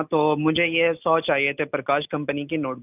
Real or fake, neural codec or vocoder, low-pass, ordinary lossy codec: real; none; 3.6 kHz; none